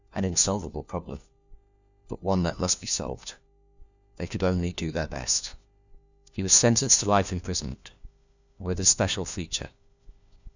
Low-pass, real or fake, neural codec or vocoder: 7.2 kHz; fake; codec, 16 kHz, 1 kbps, FunCodec, trained on LibriTTS, 50 frames a second